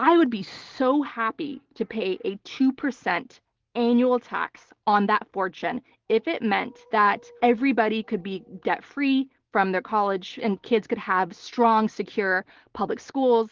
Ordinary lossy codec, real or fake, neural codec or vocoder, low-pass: Opus, 16 kbps; real; none; 7.2 kHz